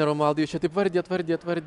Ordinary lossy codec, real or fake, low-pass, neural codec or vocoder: MP3, 96 kbps; real; 10.8 kHz; none